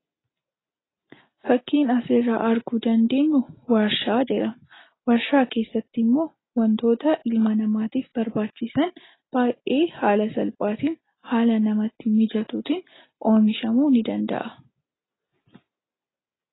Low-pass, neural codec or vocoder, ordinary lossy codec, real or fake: 7.2 kHz; none; AAC, 16 kbps; real